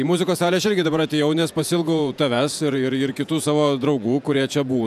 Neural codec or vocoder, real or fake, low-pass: vocoder, 48 kHz, 128 mel bands, Vocos; fake; 14.4 kHz